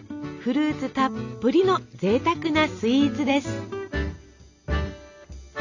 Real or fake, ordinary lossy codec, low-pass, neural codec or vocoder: real; none; 7.2 kHz; none